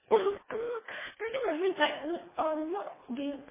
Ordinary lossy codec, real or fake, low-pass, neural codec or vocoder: MP3, 16 kbps; fake; 3.6 kHz; codec, 24 kHz, 1.5 kbps, HILCodec